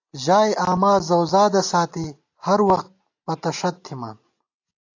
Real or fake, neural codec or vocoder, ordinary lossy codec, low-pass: real; none; AAC, 48 kbps; 7.2 kHz